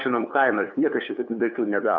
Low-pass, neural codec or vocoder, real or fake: 7.2 kHz; codec, 16 kHz, 2 kbps, FunCodec, trained on LibriTTS, 25 frames a second; fake